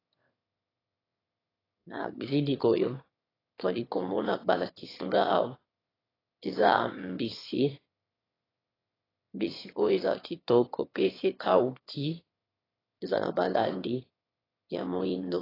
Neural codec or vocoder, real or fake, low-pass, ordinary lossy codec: autoencoder, 22.05 kHz, a latent of 192 numbers a frame, VITS, trained on one speaker; fake; 5.4 kHz; AAC, 24 kbps